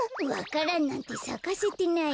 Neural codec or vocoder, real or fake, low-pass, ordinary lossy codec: none; real; none; none